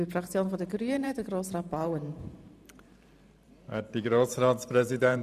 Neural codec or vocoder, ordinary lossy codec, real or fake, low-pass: vocoder, 44.1 kHz, 128 mel bands every 512 samples, BigVGAN v2; none; fake; 14.4 kHz